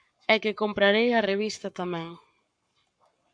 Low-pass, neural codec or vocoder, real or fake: 9.9 kHz; codec, 44.1 kHz, 7.8 kbps, Pupu-Codec; fake